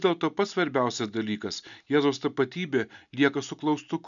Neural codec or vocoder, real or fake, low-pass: none; real; 7.2 kHz